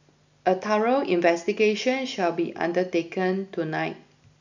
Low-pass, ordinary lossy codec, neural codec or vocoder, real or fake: 7.2 kHz; none; none; real